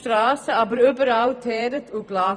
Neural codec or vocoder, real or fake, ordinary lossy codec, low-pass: vocoder, 44.1 kHz, 128 mel bands every 512 samples, BigVGAN v2; fake; none; 9.9 kHz